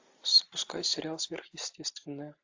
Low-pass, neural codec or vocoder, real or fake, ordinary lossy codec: 7.2 kHz; none; real; AAC, 48 kbps